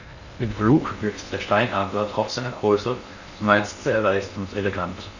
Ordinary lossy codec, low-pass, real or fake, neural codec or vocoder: none; 7.2 kHz; fake; codec, 16 kHz in and 24 kHz out, 0.6 kbps, FocalCodec, streaming, 2048 codes